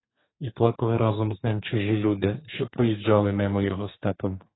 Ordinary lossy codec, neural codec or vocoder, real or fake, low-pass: AAC, 16 kbps; codec, 32 kHz, 1.9 kbps, SNAC; fake; 7.2 kHz